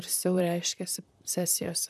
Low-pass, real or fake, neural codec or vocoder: 14.4 kHz; fake; vocoder, 44.1 kHz, 128 mel bands, Pupu-Vocoder